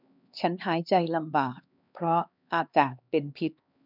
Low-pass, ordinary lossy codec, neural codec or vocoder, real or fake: 5.4 kHz; none; codec, 16 kHz, 2 kbps, X-Codec, HuBERT features, trained on LibriSpeech; fake